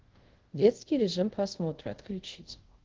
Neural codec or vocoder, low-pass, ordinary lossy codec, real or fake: codec, 24 kHz, 0.5 kbps, DualCodec; 7.2 kHz; Opus, 16 kbps; fake